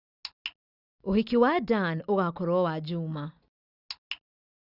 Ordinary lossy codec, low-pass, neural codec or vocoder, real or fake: Opus, 64 kbps; 5.4 kHz; none; real